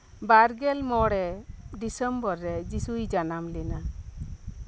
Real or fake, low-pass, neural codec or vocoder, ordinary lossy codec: real; none; none; none